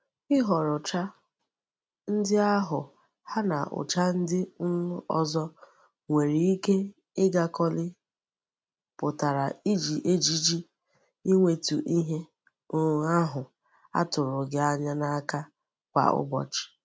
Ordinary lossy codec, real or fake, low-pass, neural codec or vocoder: none; real; none; none